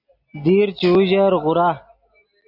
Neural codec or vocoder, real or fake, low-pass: none; real; 5.4 kHz